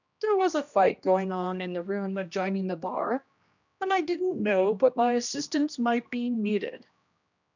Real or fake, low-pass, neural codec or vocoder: fake; 7.2 kHz; codec, 16 kHz, 1 kbps, X-Codec, HuBERT features, trained on general audio